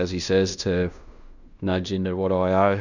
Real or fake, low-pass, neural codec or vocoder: fake; 7.2 kHz; codec, 16 kHz in and 24 kHz out, 0.9 kbps, LongCat-Audio-Codec, fine tuned four codebook decoder